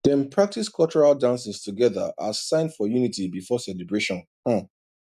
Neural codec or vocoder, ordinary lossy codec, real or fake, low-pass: none; none; real; 14.4 kHz